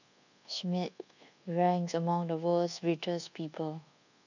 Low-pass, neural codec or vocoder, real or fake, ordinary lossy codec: 7.2 kHz; codec, 24 kHz, 1.2 kbps, DualCodec; fake; none